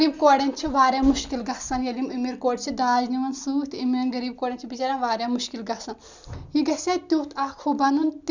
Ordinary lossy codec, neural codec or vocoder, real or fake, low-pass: Opus, 64 kbps; none; real; 7.2 kHz